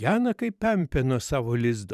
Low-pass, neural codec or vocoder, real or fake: 14.4 kHz; vocoder, 44.1 kHz, 128 mel bands every 512 samples, BigVGAN v2; fake